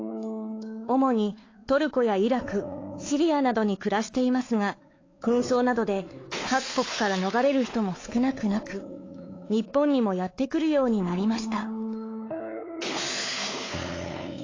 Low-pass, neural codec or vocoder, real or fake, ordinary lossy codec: 7.2 kHz; codec, 16 kHz, 4 kbps, X-Codec, WavLM features, trained on Multilingual LibriSpeech; fake; AAC, 32 kbps